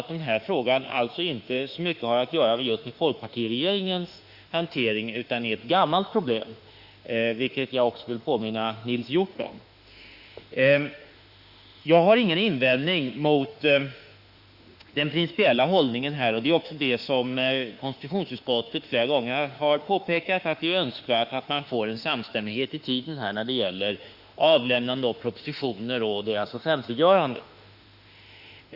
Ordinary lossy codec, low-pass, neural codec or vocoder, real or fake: Opus, 64 kbps; 5.4 kHz; autoencoder, 48 kHz, 32 numbers a frame, DAC-VAE, trained on Japanese speech; fake